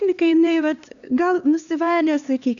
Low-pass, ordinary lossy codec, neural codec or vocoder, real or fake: 7.2 kHz; MP3, 96 kbps; codec, 16 kHz, 2 kbps, X-Codec, HuBERT features, trained on LibriSpeech; fake